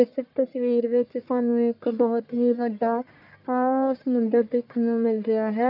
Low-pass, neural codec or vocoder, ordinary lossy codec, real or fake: 5.4 kHz; codec, 44.1 kHz, 1.7 kbps, Pupu-Codec; AAC, 48 kbps; fake